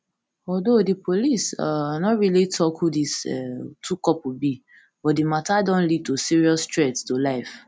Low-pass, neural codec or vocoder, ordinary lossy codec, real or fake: none; none; none; real